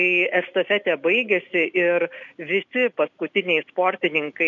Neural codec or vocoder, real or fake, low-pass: none; real; 7.2 kHz